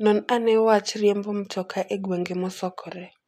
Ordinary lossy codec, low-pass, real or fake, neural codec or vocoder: none; 14.4 kHz; real; none